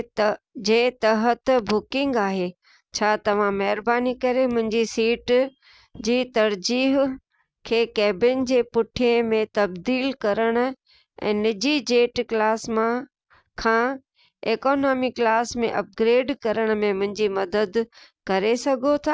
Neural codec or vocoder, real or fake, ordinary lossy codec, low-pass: none; real; none; none